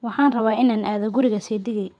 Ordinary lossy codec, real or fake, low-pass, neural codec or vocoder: none; fake; 9.9 kHz; vocoder, 22.05 kHz, 80 mel bands, WaveNeXt